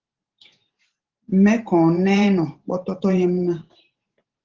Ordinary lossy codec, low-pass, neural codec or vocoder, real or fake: Opus, 16 kbps; 7.2 kHz; vocoder, 44.1 kHz, 128 mel bands every 512 samples, BigVGAN v2; fake